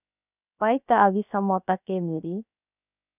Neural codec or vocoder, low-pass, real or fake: codec, 16 kHz, 0.7 kbps, FocalCodec; 3.6 kHz; fake